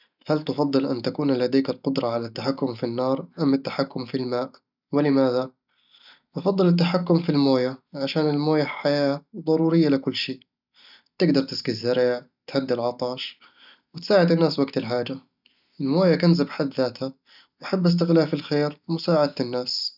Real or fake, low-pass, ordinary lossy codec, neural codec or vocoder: real; 5.4 kHz; none; none